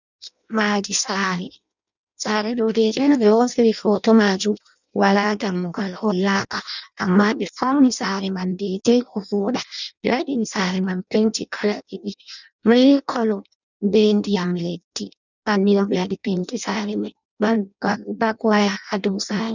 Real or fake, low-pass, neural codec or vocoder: fake; 7.2 kHz; codec, 16 kHz in and 24 kHz out, 0.6 kbps, FireRedTTS-2 codec